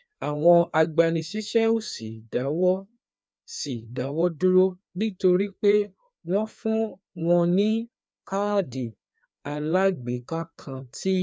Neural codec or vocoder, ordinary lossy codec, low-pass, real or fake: codec, 16 kHz, 2 kbps, FreqCodec, larger model; none; none; fake